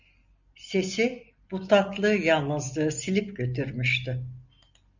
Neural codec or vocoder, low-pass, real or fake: none; 7.2 kHz; real